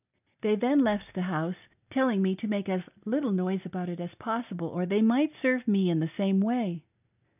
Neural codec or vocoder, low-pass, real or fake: none; 3.6 kHz; real